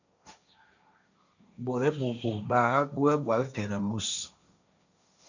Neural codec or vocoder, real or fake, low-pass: codec, 16 kHz, 1.1 kbps, Voila-Tokenizer; fake; 7.2 kHz